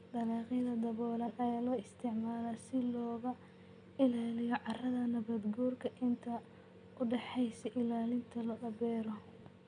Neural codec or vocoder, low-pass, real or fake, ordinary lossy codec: none; 9.9 kHz; real; none